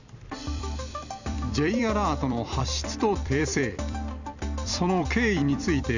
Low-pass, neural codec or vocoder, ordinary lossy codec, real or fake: 7.2 kHz; vocoder, 44.1 kHz, 128 mel bands every 256 samples, BigVGAN v2; none; fake